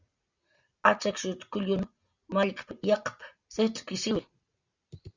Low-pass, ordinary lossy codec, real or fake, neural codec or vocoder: 7.2 kHz; Opus, 64 kbps; real; none